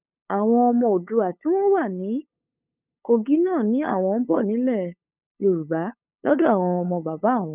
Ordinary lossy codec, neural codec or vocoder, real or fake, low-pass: none; codec, 16 kHz, 8 kbps, FunCodec, trained on LibriTTS, 25 frames a second; fake; 3.6 kHz